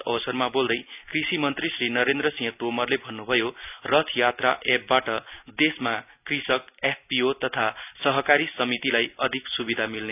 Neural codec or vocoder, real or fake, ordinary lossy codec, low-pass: none; real; none; 3.6 kHz